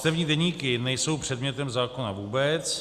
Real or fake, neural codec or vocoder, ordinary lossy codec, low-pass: real; none; Opus, 64 kbps; 14.4 kHz